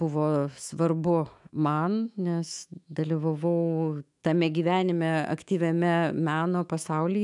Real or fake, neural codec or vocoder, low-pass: fake; autoencoder, 48 kHz, 128 numbers a frame, DAC-VAE, trained on Japanese speech; 10.8 kHz